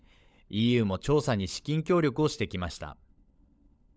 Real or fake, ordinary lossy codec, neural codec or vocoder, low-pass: fake; none; codec, 16 kHz, 16 kbps, FunCodec, trained on LibriTTS, 50 frames a second; none